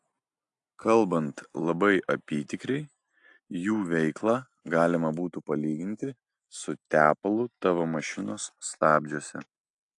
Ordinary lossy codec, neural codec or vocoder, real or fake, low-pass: AAC, 64 kbps; none; real; 10.8 kHz